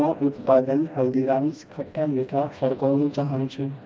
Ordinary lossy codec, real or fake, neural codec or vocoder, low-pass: none; fake; codec, 16 kHz, 1 kbps, FreqCodec, smaller model; none